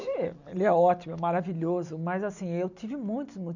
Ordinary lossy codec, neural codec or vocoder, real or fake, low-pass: none; none; real; 7.2 kHz